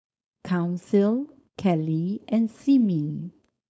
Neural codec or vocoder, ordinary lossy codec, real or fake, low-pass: codec, 16 kHz, 4.8 kbps, FACodec; none; fake; none